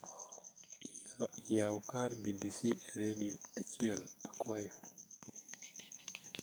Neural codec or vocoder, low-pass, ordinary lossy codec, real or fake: codec, 44.1 kHz, 2.6 kbps, SNAC; none; none; fake